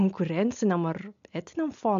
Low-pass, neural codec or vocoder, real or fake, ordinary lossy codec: 7.2 kHz; none; real; MP3, 64 kbps